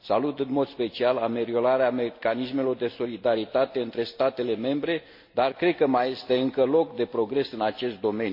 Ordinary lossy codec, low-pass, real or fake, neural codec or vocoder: MP3, 32 kbps; 5.4 kHz; real; none